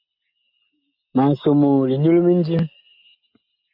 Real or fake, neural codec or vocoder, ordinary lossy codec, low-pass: real; none; AAC, 48 kbps; 5.4 kHz